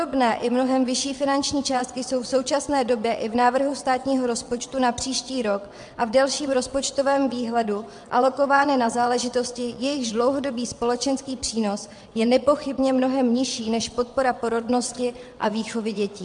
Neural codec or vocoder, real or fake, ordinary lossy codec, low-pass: vocoder, 22.05 kHz, 80 mel bands, WaveNeXt; fake; MP3, 64 kbps; 9.9 kHz